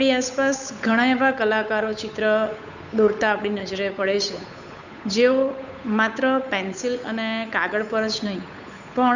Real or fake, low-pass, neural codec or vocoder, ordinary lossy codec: fake; 7.2 kHz; codec, 16 kHz, 8 kbps, FunCodec, trained on Chinese and English, 25 frames a second; none